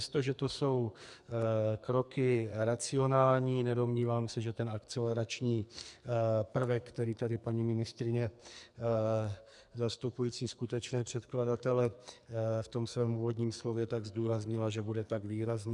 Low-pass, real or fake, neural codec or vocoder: 10.8 kHz; fake; codec, 44.1 kHz, 2.6 kbps, SNAC